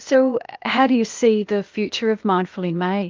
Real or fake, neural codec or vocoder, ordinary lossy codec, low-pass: fake; codec, 16 kHz, 0.8 kbps, ZipCodec; Opus, 24 kbps; 7.2 kHz